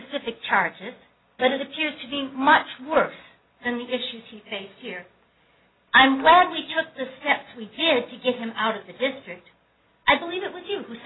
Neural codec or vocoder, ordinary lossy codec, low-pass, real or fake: none; AAC, 16 kbps; 7.2 kHz; real